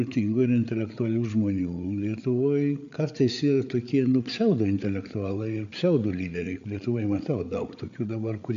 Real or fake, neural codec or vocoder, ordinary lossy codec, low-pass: fake; codec, 16 kHz, 8 kbps, FreqCodec, larger model; AAC, 64 kbps; 7.2 kHz